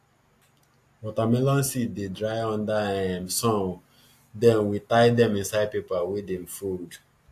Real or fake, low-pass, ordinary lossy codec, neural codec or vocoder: real; 14.4 kHz; AAC, 64 kbps; none